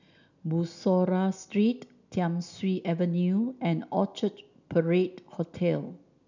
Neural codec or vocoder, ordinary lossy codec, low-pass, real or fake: none; none; 7.2 kHz; real